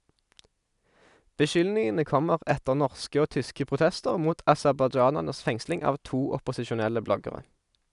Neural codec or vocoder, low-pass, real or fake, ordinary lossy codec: none; 10.8 kHz; real; none